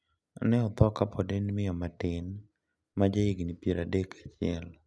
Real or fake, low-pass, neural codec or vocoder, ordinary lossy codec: real; none; none; none